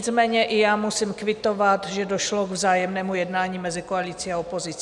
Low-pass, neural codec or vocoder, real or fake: 10.8 kHz; none; real